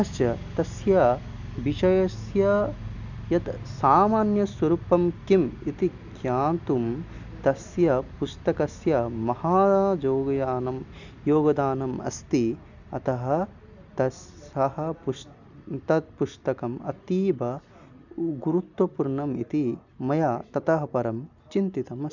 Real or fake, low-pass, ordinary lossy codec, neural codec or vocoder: real; 7.2 kHz; none; none